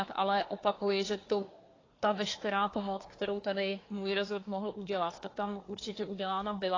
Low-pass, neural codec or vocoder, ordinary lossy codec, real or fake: 7.2 kHz; codec, 24 kHz, 1 kbps, SNAC; AAC, 32 kbps; fake